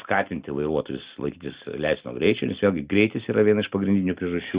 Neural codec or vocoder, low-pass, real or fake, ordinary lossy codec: none; 3.6 kHz; real; Opus, 64 kbps